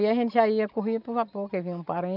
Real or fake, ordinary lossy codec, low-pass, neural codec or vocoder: real; none; 5.4 kHz; none